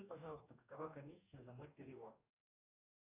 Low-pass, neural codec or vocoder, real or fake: 3.6 kHz; codec, 44.1 kHz, 2.6 kbps, DAC; fake